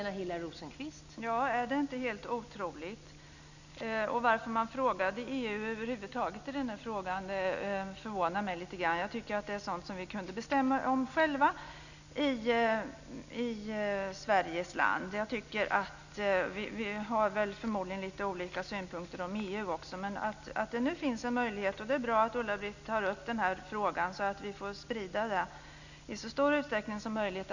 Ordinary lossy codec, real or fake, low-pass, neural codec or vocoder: none; real; 7.2 kHz; none